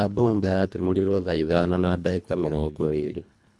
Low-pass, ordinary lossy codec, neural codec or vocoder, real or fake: none; none; codec, 24 kHz, 1.5 kbps, HILCodec; fake